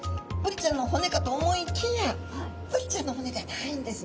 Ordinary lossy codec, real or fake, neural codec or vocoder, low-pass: none; real; none; none